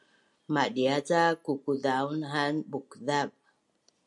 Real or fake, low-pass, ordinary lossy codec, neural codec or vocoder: real; 10.8 kHz; MP3, 96 kbps; none